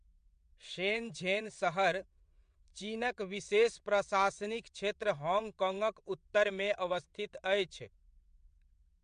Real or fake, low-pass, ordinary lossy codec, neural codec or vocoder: fake; 9.9 kHz; MP3, 64 kbps; vocoder, 22.05 kHz, 80 mel bands, WaveNeXt